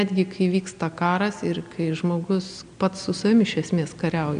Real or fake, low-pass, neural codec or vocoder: real; 9.9 kHz; none